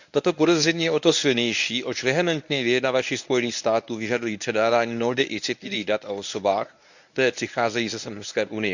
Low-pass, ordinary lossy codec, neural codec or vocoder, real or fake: 7.2 kHz; none; codec, 24 kHz, 0.9 kbps, WavTokenizer, medium speech release version 1; fake